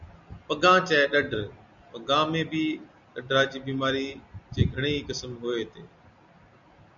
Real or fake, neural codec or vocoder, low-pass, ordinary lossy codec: real; none; 7.2 kHz; MP3, 64 kbps